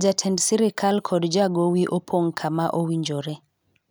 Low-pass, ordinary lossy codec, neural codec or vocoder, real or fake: none; none; none; real